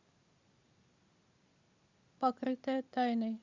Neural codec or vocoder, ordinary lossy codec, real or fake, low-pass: none; Opus, 64 kbps; real; 7.2 kHz